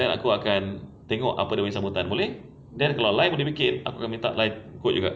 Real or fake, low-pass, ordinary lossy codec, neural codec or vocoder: real; none; none; none